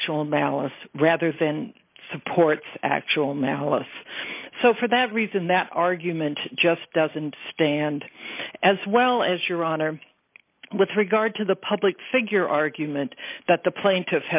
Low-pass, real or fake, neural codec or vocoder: 3.6 kHz; real; none